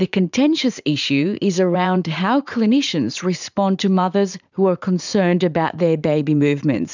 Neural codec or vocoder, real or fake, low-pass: vocoder, 44.1 kHz, 80 mel bands, Vocos; fake; 7.2 kHz